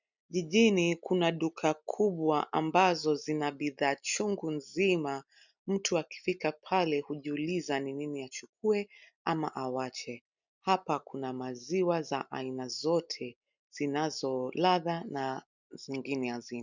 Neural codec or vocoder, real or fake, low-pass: none; real; 7.2 kHz